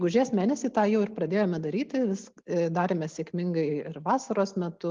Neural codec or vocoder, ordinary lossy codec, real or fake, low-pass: none; Opus, 32 kbps; real; 7.2 kHz